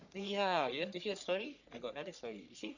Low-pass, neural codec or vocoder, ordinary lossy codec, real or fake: 7.2 kHz; codec, 44.1 kHz, 3.4 kbps, Pupu-Codec; none; fake